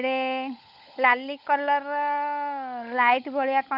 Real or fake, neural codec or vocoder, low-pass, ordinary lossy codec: fake; codec, 16 kHz, 16 kbps, FunCodec, trained on LibriTTS, 50 frames a second; 5.4 kHz; none